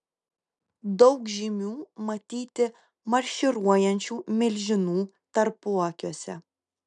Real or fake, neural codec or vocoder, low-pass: real; none; 9.9 kHz